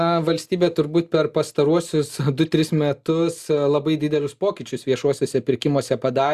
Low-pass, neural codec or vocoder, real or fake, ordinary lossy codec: 14.4 kHz; none; real; Opus, 64 kbps